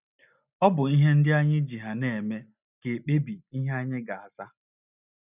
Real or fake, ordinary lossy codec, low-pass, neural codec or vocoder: real; none; 3.6 kHz; none